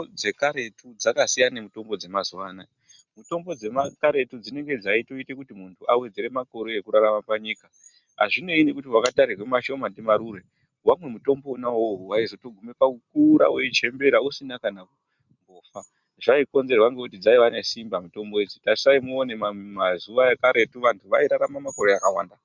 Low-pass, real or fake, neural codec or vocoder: 7.2 kHz; real; none